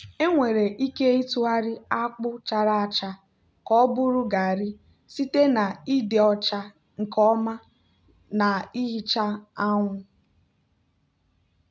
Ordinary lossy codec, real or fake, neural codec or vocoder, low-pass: none; real; none; none